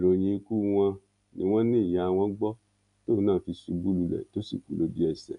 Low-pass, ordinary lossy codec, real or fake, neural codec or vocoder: 10.8 kHz; none; real; none